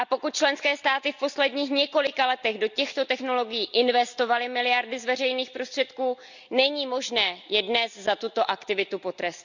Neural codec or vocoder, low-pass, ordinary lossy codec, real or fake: none; 7.2 kHz; none; real